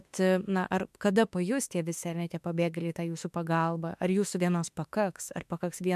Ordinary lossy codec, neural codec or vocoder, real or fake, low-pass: MP3, 96 kbps; autoencoder, 48 kHz, 32 numbers a frame, DAC-VAE, trained on Japanese speech; fake; 14.4 kHz